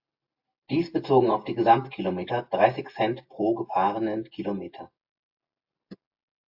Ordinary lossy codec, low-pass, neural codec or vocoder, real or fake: AAC, 48 kbps; 5.4 kHz; none; real